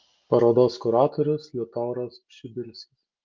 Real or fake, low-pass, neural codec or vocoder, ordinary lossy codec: real; 7.2 kHz; none; Opus, 24 kbps